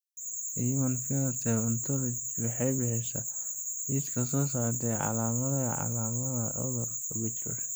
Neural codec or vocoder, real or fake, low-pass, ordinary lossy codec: none; real; none; none